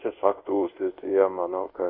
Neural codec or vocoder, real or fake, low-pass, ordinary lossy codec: codec, 24 kHz, 0.5 kbps, DualCodec; fake; 5.4 kHz; MP3, 32 kbps